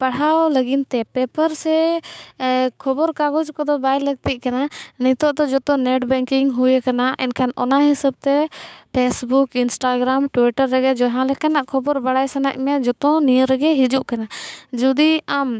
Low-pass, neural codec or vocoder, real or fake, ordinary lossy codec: none; codec, 16 kHz, 6 kbps, DAC; fake; none